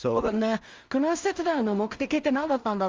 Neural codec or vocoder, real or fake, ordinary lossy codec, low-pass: codec, 16 kHz in and 24 kHz out, 0.4 kbps, LongCat-Audio-Codec, two codebook decoder; fake; Opus, 32 kbps; 7.2 kHz